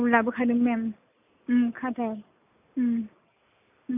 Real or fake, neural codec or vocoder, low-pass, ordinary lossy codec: real; none; 3.6 kHz; none